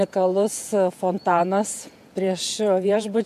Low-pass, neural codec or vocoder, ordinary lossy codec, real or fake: 14.4 kHz; vocoder, 48 kHz, 128 mel bands, Vocos; AAC, 96 kbps; fake